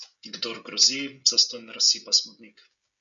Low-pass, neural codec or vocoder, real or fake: 7.2 kHz; codec, 16 kHz, 16 kbps, FreqCodec, smaller model; fake